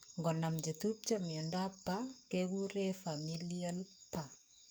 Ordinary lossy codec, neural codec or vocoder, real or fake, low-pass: none; codec, 44.1 kHz, 7.8 kbps, DAC; fake; none